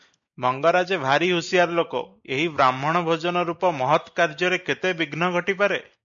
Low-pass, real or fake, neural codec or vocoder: 7.2 kHz; real; none